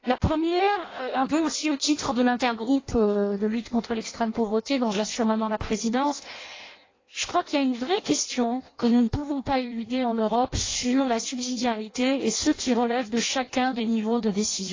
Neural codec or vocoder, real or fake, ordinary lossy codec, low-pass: codec, 16 kHz in and 24 kHz out, 0.6 kbps, FireRedTTS-2 codec; fake; AAC, 32 kbps; 7.2 kHz